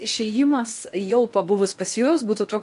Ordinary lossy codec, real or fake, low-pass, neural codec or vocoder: MP3, 64 kbps; fake; 10.8 kHz; codec, 16 kHz in and 24 kHz out, 0.8 kbps, FocalCodec, streaming, 65536 codes